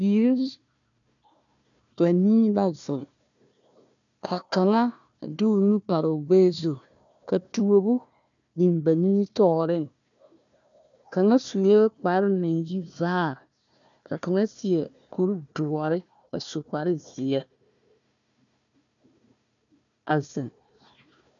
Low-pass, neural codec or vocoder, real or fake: 7.2 kHz; codec, 16 kHz, 1 kbps, FunCodec, trained on Chinese and English, 50 frames a second; fake